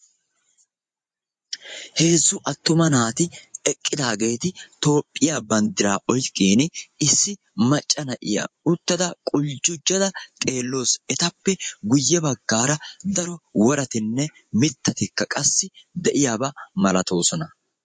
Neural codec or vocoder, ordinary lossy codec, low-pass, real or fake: vocoder, 24 kHz, 100 mel bands, Vocos; MP3, 48 kbps; 9.9 kHz; fake